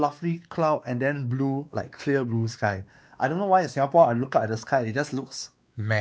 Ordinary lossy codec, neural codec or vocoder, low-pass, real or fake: none; codec, 16 kHz, 2 kbps, X-Codec, WavLM features, trained on Multilingual LibriSpeech; none; fake